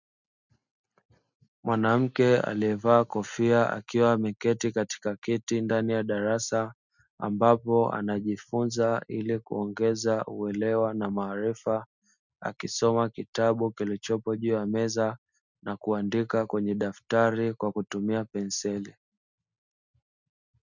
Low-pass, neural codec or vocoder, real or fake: 7.2 kHz; none; real